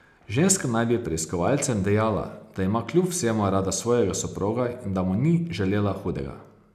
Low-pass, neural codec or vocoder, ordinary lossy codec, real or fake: 14.4 kHz; none; none; real